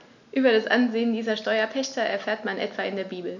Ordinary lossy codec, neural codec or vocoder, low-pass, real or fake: none; none; 7.2 kHz; real